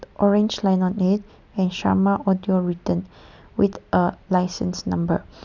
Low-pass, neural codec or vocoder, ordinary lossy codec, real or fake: 7.2 kHz; none; none; real